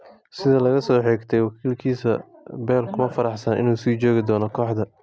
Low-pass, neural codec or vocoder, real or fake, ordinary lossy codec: none; none; real; none